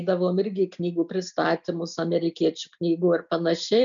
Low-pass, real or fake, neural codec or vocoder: 7.2 kHz; real; none